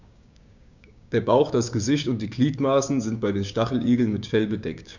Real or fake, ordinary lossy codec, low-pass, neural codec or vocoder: fake; none; 7.2 kHz; codec, 16 kHz, 6 kbps, DAC